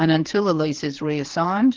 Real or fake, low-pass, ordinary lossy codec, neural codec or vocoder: fake; 7.2 kHz; Opus, 16 kbps; codec, 16 kHz, 4 kbps, FreqCodec, larger model